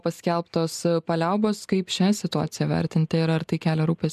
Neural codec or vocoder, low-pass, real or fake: none; 14.4 kHz; real